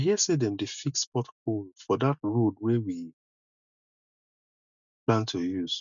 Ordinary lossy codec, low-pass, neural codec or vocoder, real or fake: none; 7.2 kHz; none; real